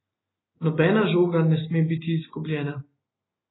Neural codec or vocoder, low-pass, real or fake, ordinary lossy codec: none; 7.2 kHz; real; AAC, 16 kbps